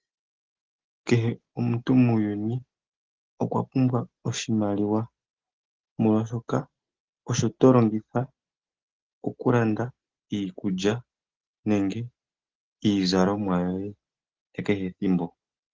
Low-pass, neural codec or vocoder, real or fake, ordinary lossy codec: 7.2 kHz; none; real; Opus, 16 kbps